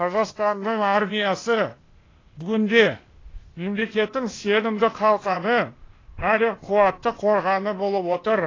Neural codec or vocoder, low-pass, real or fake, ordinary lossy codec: codec, 16 kHz, 0.8 kbps, ZipCodec; 7.2 kHz; fake; AAC, 32 kbps